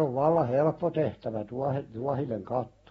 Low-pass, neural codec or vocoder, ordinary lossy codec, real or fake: 19.8 kHz; none; AAC, 24 kbps; real